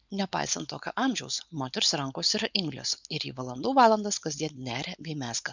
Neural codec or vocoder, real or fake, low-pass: codec, 16 kHz, 4.8 kbps, FACodec; fake; 7.2 kHz